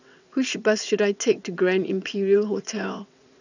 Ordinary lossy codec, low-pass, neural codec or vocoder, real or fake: none; 7.2 kHz; vocoder, 22.05 kHz, 80 mel bands, WaveNeXt; fake